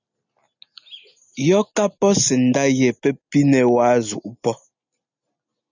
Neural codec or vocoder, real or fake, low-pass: none; real; 7.2 kHz